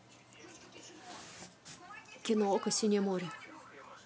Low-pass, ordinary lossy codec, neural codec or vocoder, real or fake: none; none; none; real